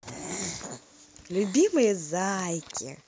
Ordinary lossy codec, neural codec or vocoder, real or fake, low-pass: none; none; real; none